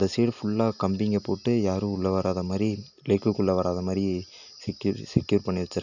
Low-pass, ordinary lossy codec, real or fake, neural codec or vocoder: 7.2 kHz; none; real; none